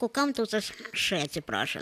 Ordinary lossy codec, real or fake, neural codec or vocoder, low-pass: AAC, 96 kbps; real; none; 14.4 kHz